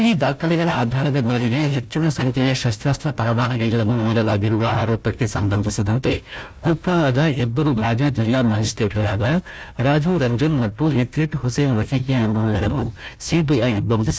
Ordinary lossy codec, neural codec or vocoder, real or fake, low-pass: none; codec, 16 kHz, 1 kbps, FunCodec, trained on Chinese and English, 50 frames a second; fake; none